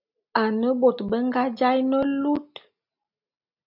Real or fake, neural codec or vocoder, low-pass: real; none; 5.4 kHz